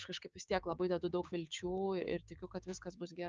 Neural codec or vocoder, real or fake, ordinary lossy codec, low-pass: codec, 16 kHz, 6 kbps, DAC; fake; Opus, 24 kbps; 7.2 kHz